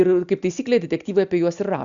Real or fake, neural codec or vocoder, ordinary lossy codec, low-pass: real; none; Opus, 64 kbps; 7.2 kHz